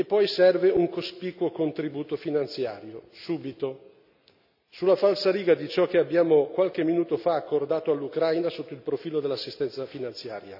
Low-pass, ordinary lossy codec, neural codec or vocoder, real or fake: 5.4 kHz; none; none; real